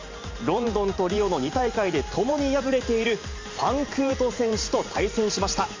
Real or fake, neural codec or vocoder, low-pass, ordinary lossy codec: fake; vocoder, 44.1 kHz, 128 mel bands every 512 samples, BigVGAN v2; 7.2 kHz; MP3, 64 kbps